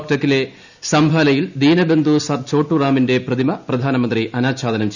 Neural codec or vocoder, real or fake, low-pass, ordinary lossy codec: none; real; 7.2 kHz; none